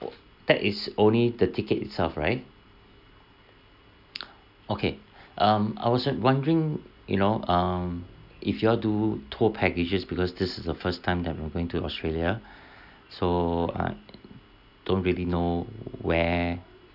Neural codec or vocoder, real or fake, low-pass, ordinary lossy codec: none; real; 5.4 kHz; none